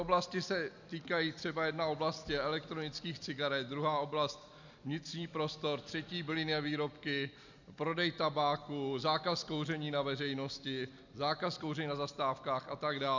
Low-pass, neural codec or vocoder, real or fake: 7.2 kHz; none; real